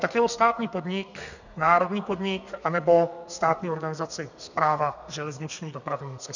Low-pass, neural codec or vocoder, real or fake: 7.2 kHz; codec, 32 kHz, 1.9 kbps, SNAC; fake